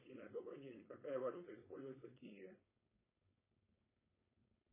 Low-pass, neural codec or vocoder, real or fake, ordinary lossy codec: 3.6 kHz; codec, 16 kHz, 4.8 kbps, FACodec; fake; MP3, 16 kbps